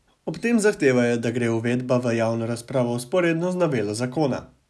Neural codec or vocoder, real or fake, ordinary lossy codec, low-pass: none; real; none; none